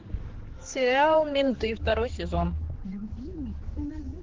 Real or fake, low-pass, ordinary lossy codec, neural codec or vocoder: fake; 7.2 kHz; Opus, 16 kbps; codec, 16 kHz, 4 kbps, X-Codec, HuBERT features, trained on general audio